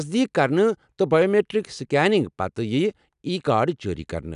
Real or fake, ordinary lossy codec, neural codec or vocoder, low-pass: real; none; none; 10.8 kHz